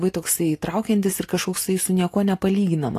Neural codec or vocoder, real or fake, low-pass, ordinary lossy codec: none; real; 14.4 kHz; AAC, 48 kbps